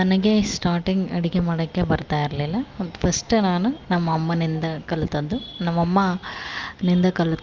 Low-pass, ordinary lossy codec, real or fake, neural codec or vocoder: 7.2 kHz; Opus, 24 kbps; real; none